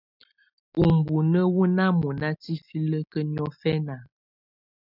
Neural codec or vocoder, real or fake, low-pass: none; real; 5.4 kHz